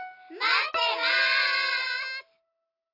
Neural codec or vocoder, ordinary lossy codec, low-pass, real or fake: none; AAC, 32 kbps; 5.4 kHz; real